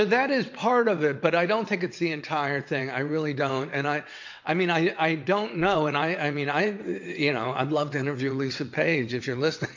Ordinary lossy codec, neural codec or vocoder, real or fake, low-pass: MP3, 48 kbps; vocoder, 22.05 kHz, 80 mel bands, WaveNeXt; fake; 7.2 kHz